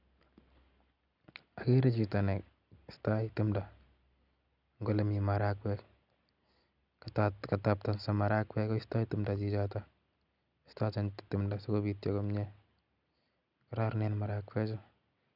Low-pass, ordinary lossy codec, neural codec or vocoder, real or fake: 5.4 kHz; none; none; real